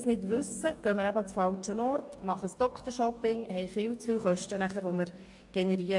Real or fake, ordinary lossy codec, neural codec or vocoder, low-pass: fake; AAC, 64 kbps; codec, 44.1 kHz, 2.6 kbps, DAC; 10.8 kHz